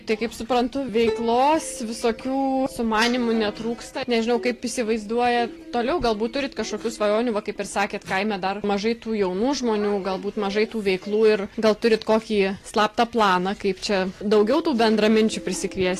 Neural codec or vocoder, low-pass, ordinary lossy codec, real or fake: none; 14.4 kHz; AAC, 48 kbps; real